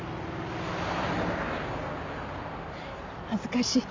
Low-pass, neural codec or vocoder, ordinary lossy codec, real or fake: 7.2 kHz; none; MP3, 64 kbps; real